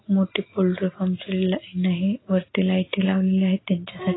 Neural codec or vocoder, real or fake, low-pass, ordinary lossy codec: none; real; 7.2 kHz; AAC, 16 kbps